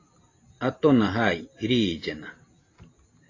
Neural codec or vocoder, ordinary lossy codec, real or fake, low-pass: none; AAC, 32 kbps; real; 7.2 kHz